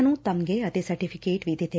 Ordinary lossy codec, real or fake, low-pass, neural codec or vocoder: none; real; none; none